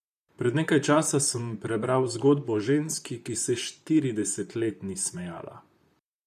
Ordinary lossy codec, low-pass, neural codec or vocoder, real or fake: none; 14.4 kHz; vocoder, 44.1 kHz, 128 mel bands, Pupu-Vocoder; fake